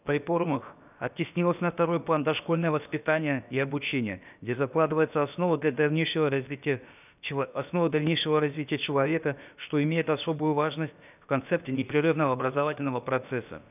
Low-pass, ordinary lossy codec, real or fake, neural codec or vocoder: 3.6 kHz; none; fake; codec, 16 kHz, about 1 kbps, DyCAST, with the encoder's durations